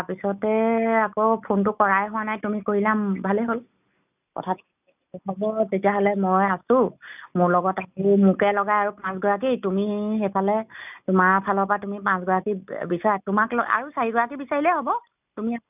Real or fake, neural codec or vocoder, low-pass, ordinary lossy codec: real; none; 3.6 kHz; none